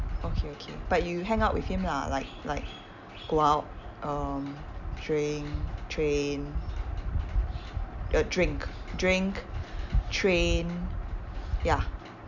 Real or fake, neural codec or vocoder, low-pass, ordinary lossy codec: real; none; 7.2 kHz; none